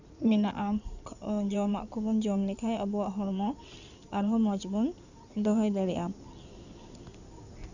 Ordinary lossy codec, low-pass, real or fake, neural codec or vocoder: none; 7.2 kHz; fake; codec, 16 kHz in and 24 kHz out, 2.2 kbps, FireRedTTS-2 codec